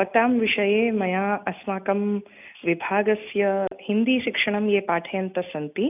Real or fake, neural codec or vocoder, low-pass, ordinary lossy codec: real; none; 3.6 kHz; none